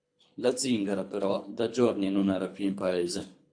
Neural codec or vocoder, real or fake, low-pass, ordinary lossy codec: codec, 24 kHz, 3 kbps, HILCodec; fake; 9.9 kHz; AAC, 64 kbps